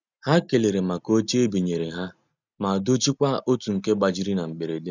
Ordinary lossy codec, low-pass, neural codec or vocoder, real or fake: none; 7.2 kHz; none; real